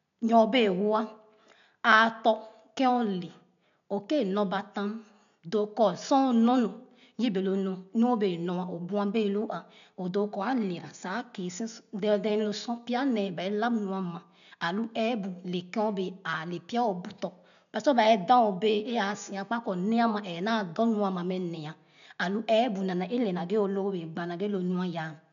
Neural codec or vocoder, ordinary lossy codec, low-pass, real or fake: none; none; 7.2 kHz; real